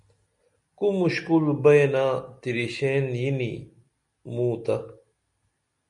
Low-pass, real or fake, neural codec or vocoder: 10.8 kHz; real; none